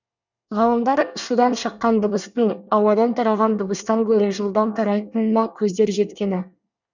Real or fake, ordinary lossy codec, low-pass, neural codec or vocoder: fake; none; 7.2 kHz; codec, 24 kHz, 1 kbps, SNAC